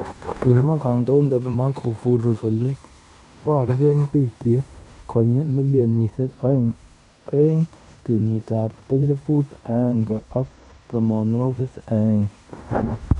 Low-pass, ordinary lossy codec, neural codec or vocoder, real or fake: 10.8 kHz; none; codec, 16 kHz in and 24 kHz out, 0.9 kbps, LongCat-Audio-Codec, fine tuned four codebook decoder; fake